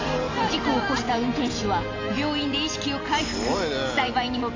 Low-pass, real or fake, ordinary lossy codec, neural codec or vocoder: 7.2 kHz; real; AAC, 32 kbps; none